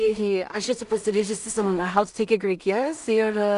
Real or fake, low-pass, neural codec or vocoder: fake; 10.8 kHz; codec, 16 kHz in and 24 kHz out, 0.4 kbps, LongCat-Audio-Codec, two codebook decoder